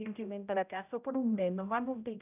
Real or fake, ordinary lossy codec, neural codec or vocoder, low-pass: fake; none; codec, 16 kHz, 0.5 kbps, X-Codec, HuBERT features, trained on general audio; 3.6 kHz